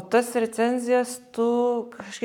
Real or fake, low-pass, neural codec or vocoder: fake; 19.8 kHz; codec, 44.1 kHz, 7.8 kbps, Pupu-Codec